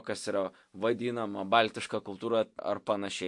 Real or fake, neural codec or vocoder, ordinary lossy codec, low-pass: real; none; MP3, 64 kbps; 10.8 kHz